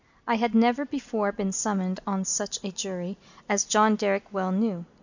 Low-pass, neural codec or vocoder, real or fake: 7.2 kHz; none; real